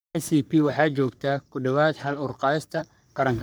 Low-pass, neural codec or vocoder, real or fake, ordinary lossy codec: none; codec, 44.1 kHz, 3.4 kbps, Pupu-Codec; fake; none